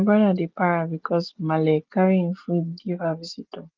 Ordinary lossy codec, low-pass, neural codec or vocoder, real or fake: Opus, 32 kbps; 7.2 kHz; none; real